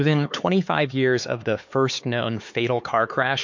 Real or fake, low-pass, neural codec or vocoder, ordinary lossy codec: fake; 7.2 kHz; codec, 16 kHz, 4 kbps, X-Codec, HuBERT features, trained on LibriSpeech; MP3, 48 kbps